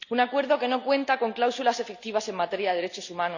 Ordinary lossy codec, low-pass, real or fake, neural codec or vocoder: none; 7.2 kHz; real; none